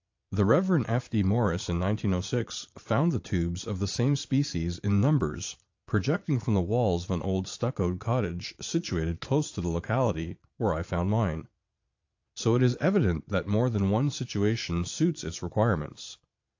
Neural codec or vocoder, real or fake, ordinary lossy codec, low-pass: vocoder, 22.05 kHz, 80 mel bands, Vocos; fake; AAC, 48 kbps; 7.2 kHz